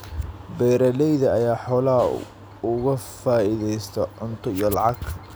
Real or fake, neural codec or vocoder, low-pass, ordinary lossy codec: real; none; none; none